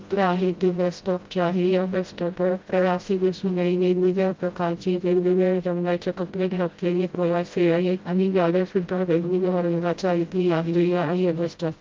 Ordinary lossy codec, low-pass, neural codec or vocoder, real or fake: Opus, 32 kbps; 7.2 kHz; codec, 16 kHz, 0.5 kbps, FreqCodec, smaller model; fake